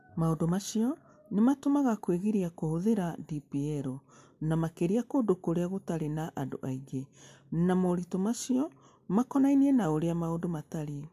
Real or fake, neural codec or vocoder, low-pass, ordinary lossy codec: real; none; 14.4 kHz; MP3, 96 kbps